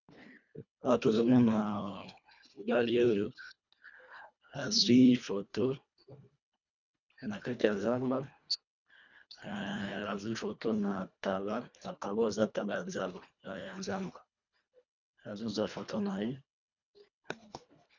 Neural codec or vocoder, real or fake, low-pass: codec, 24 kHz, 1.5 kbps, HILCodec; fake; 7.2 kHz